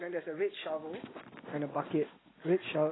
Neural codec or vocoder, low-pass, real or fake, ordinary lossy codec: none; 7.2 kHz; real; AAC, 16 kbps